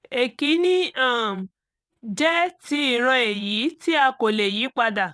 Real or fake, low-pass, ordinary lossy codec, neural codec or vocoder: fake; none; none; vocoder, 22.05 kHz, 80 mel bands, WaveNeXt